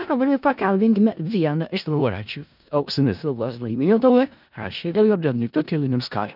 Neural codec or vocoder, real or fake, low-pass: codec, 16 kHz in and 24 kHz out, 0.4 kbps, LongCat-Audio-Codec, four codebook decoder; fake; 5.4 kHz